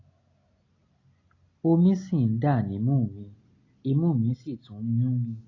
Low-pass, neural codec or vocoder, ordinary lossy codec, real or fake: 7.2 kHz; none; AAC, 32 kbps; real